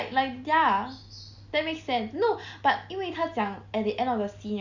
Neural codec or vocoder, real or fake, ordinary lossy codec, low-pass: none; real; Opus, 64 kbps; 7.2 kHz